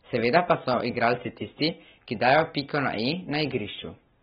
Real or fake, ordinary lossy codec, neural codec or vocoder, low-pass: real; AAC, 16 kbps; none; 9.9 kHz